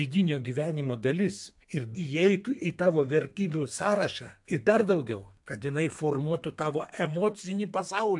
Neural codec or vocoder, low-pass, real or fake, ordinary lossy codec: codec, 32 kHz, 1.9 kbps, SNAC; 10.8 kHz; fake; AAC, 64 kbps